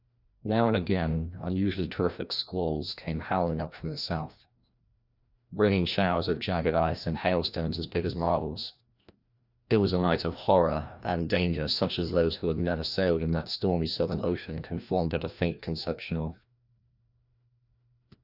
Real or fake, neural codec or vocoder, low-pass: fake; codec, 16 kHz, 1 kbps, FreqCodec, larger model; 5.4 kHz